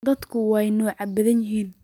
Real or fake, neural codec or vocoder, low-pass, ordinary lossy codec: real; none; 19.8 kHz; none